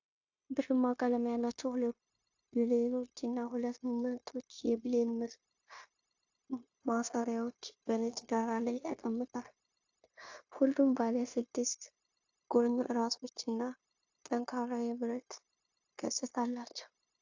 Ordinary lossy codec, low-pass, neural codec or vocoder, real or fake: AAC, 48 kbps; 7.2 kHz; codec, 16 kHz, 0.9 kbps, LongCat-Audio-Codec; fake